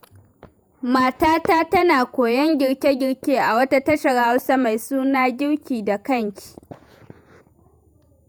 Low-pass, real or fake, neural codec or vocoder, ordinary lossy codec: none; fake; vocoder, 48 kHz, 128 mel bands, Vocos; none